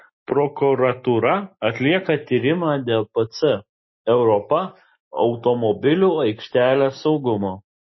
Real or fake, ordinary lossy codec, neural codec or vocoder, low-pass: real; MP3, 24 kbps; none; 7.2 kHz